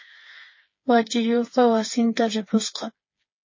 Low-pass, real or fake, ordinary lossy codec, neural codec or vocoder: 7.2 kHz; fake; MP3, 32 kbps; codec, 16 kHz, 8 kbps, FreqCodec, smaller model